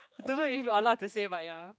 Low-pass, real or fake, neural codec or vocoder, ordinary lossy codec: none; fake; codec, 16 kHz, 2 kbps, X-Codec, HuBERT features, trained on general audio; none